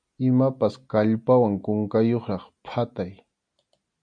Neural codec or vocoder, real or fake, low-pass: none; real; 9.9 kHz